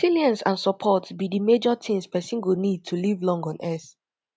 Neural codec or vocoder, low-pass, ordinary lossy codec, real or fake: none; none; none; real